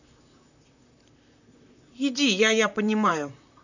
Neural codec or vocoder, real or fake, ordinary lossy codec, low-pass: vocoder, 44.1 kHz, 128 mel bands, Pupu-Vocoder; fake; none; 7.2 kHz